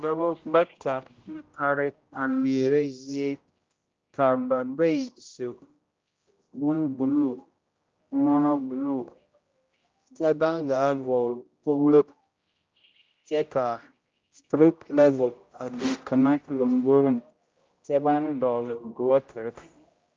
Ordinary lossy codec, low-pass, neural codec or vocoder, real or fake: Opus, 24 kbps; 7.2 kHz; codec, 16 kHz, 0.5 kbps, X-Codec, HuBERT features, trained on general audio; fake